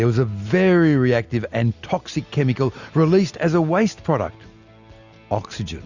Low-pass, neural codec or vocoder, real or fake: 7.2 kHz; none; real